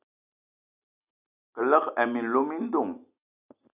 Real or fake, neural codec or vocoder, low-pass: real; none; 3.6 kHz